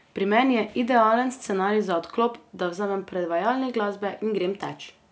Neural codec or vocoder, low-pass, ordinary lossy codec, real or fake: none; none; none; real